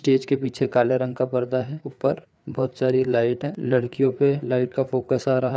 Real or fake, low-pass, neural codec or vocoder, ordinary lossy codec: fake; none; codec, 16 kHz, 4 kbps, FreqCodec, larger model; none